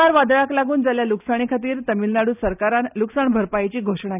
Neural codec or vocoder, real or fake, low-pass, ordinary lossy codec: none; real; 3.6 kHz; none